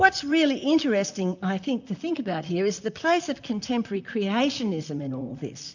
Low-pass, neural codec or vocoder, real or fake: 7.2 kHz; vocoder, 44.1 kHz, 128 mel bands, Pupu-Vocoder; fake